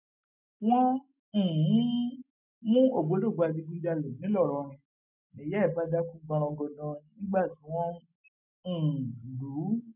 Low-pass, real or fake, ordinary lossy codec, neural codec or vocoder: 3.6 kHz; real; none; none